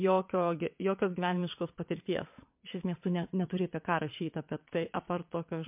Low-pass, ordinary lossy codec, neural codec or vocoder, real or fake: 3.6 kHz; MP3, 32 kbps; vocoder, 22.05 kHz, 80 mel bands, WaveNeXt; fake